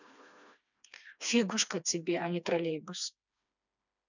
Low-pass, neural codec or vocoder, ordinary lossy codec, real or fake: 7.2 kHz; codec, 16 kHz, 2 kbps, FreqCodec, smaller model; none; fake